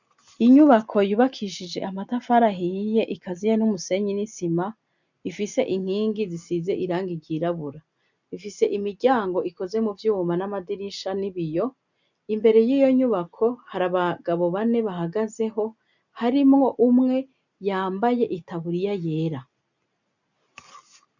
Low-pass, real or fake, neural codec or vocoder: 7.2 kHz; real; none